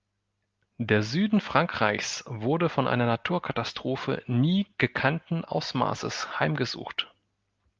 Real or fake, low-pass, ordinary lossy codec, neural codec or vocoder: real; 7.2 kHz; Opus, 32 kbps; none